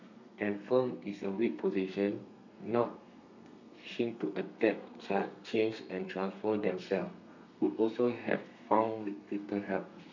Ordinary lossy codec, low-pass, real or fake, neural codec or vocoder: none; 7.2 kHz; fake; codec, 32 kHz, 1.9 kbps, SNAC